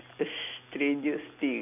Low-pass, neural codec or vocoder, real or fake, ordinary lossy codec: 3.6 kHz; none; real; none